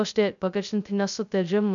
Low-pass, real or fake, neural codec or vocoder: 7.2 kHz; fake; codec, 16 kHz, 0.2 kbps, FocalCodec